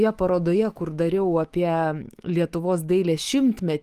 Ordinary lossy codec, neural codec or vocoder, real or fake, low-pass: Opus, 24 kbps; autoencoder, 48 kHz, 128 numbers a frame, DAC-VAE, trained on Japanese speech; fake; 14.4 kHz